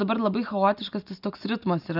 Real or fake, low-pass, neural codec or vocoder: real; 5.4 kHz; none